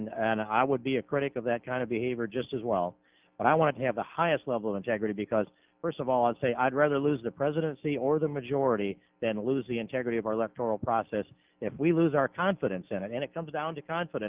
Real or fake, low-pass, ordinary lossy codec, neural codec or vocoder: real; 3.6 kHz; Opus, 32 kbps; none